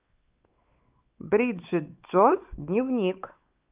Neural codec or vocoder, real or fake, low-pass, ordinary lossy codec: codec, 16 kHz, 4 kbps, X-Codec, WavLM features, trained on Multilingual LibriSpeech; fake; 3.6 kHz; Opus, 24 kbps